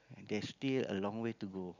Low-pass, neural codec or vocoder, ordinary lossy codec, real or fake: 7.2 kHz; none; none; real